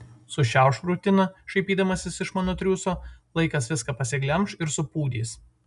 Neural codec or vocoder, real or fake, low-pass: none; real; 10.8 kHz